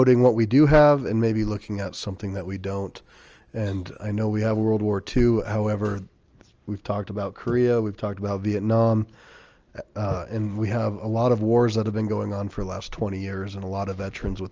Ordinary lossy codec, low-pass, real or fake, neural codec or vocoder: Opus, 24 kbps; 7.2 kHz; real; none